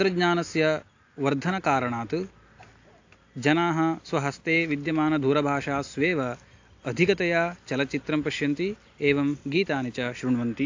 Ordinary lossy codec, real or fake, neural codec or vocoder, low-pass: AAC, 48 kbps; real; none; 7.2 kHz